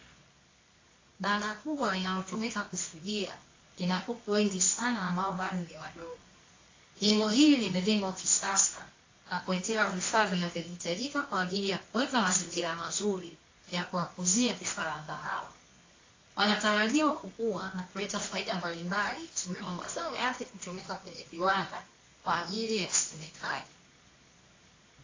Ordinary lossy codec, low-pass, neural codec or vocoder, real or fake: AAC, 32 kbps; 7.2 kHz; codec, 24 kHz, 0.9 kbps, WavTokenizer, medium music audio release; fake